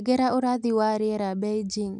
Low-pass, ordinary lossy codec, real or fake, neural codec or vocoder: none; none; real; none